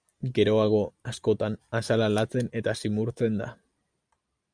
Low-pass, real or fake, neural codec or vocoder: 9.9 kHz; real; none